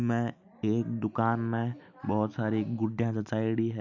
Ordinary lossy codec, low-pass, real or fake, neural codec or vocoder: none; 7.2 kHz; real; none